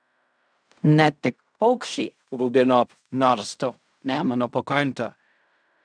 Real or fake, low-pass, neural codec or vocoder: fake; 9.9 kHz; codec, 16 kHz in and 24 kHz out, 0.4 kbps, LongCat-Audio-Codec, fine tuned four codebook decoder